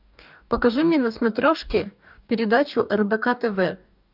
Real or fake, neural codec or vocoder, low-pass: fake; codec, 44.1 kHz, 2.6 kbps, DAC; 5.4 kHz